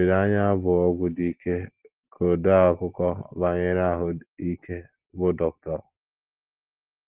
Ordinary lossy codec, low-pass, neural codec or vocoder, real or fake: Opus, 16 kbps; 3.6 kHz; none; real